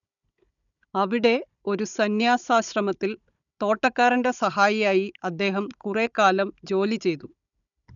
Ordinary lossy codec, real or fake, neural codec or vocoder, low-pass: none; fake; codec, 16 kHz, 16 kbps, FunCodec, trained on Chinese and English, 50 frames a second; 7.2 kHz